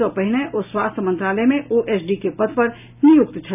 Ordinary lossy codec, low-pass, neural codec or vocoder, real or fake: none; 3.6 kHz; none; real